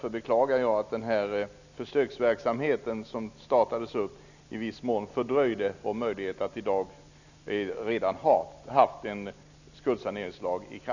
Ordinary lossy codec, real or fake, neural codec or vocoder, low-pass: none; real; none; 7.2 kHz